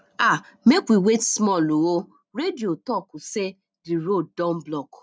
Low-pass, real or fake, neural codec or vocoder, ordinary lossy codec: none; real; none; none